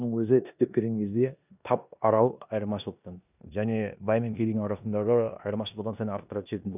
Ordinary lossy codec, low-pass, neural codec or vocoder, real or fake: none; 3.6 kHz; codec, 16 kHz in and 24 kHz out, 0.9 kbps, LongCat-Audio-Codec, four codebook decoder; fake